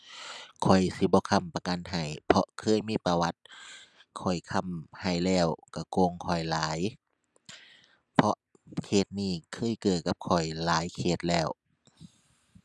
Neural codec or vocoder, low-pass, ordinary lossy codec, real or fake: none; none; none; real